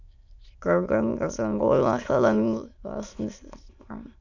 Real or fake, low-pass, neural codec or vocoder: fake; 7.2 kHz; autoencoder, 22.05 kHz, a latent of 192 numbers a frame, VITS, trained on many speakers